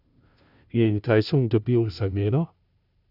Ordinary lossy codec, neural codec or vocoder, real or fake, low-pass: none; codec, 16 kHz, 0.5 kbps, FunCodec, trained on Chinese and English, 25 frames a second; fake; 5.4 kHz